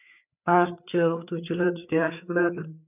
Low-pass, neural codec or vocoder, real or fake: 3.6 kHz; codec, 16 kHz, 4 kbps, FreqCodec, larger model; fake